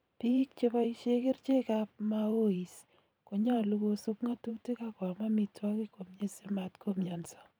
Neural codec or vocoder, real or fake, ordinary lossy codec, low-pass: vocoder, 44.1 kHz, 128 mel bands every 512 samples, BigVGAN v2; fake; none; none